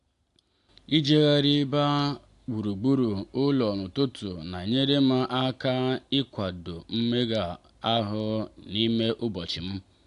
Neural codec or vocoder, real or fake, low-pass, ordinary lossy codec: none; real; 10.8 kHz; AAC, 64 kbps